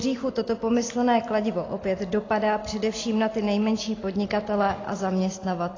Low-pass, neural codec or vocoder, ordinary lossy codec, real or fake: 7.2 kHz; none; AAC, 32 kbps; real